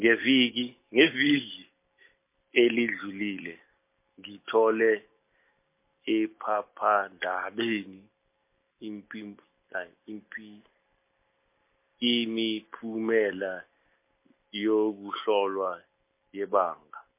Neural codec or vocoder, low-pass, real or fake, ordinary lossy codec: none; 3.6 kHz; real; MP3, 24 kbps